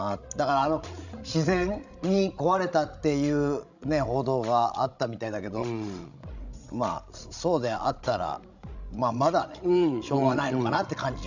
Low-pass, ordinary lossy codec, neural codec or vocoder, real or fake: 7.2 kHz; none; codec, 16 kHz, 16 kbps, FreqCodec, larger model; fake